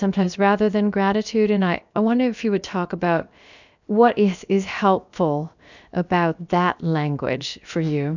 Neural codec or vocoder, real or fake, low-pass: codec, 16 kHz, about 1 kbps, DyCAST, with the encoder's durations; fake; 7.2 kHz